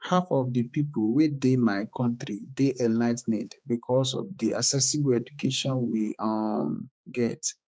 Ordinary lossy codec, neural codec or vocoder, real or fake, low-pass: none; codec, 16 kHz, 4 kbps, X-Codec, HuBERT features, trained on balanced general audio; fake; none